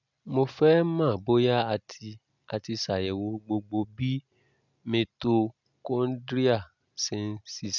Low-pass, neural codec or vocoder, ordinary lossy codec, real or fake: 7.2 kHz; none; none; real